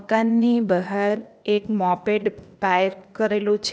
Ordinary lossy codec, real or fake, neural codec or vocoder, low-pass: none; fake; codec, 16 kHz, 0.8 kbps, ZipCodec; none